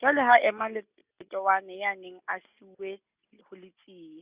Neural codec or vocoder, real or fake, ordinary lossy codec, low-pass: none; real; Opus, 64 kbps; 3.6 kHz